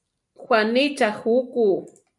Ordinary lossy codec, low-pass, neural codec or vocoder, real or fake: MP3, 96 kbps; 10.8 kHz; none; real